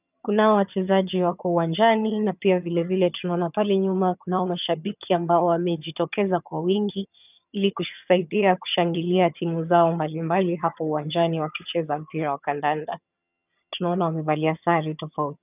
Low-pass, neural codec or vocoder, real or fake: 3.6 kHz; vocoder, 22.05 kHz, 80 mel bands, HiFi-GAN; fake